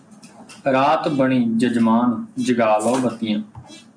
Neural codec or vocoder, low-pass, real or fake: none; 9.9 kHz; real